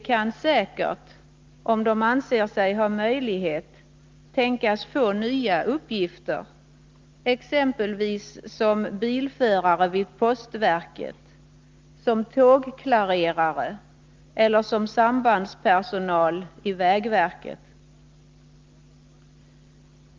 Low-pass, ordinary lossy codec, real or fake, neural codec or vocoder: 7.2 kHz; Opus, 32 kbps; real; none